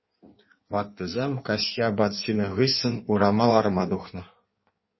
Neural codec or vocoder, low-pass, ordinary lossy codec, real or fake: codec, 16 kHz in and 24 kHz out, 1.1 kbps, FireRedTTS-2 codec; 7.2 kHz; MP3, 24 kbps; fake